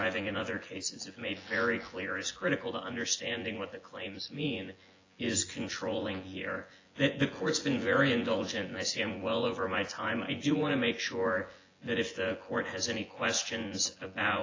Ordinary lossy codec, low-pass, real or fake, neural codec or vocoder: AAC, 32 kbps; 7.2 kHz; fake; vocoder, 24 kHz, 100 mel bands, Vocos